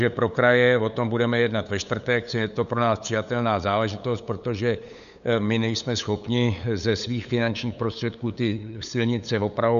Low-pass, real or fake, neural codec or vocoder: 7.2 kHz; fake; codec, 16 kHz, 8 kbps, FunCodec, trained on LibriTTS, 25 frames a second